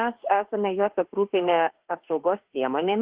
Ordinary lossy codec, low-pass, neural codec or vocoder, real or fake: Opus, 16 kbps; 3.6 kHz; autoencoder, 48 kHz, 32 numbers a frame, DAC-VAE, trained on Japanese speech; fake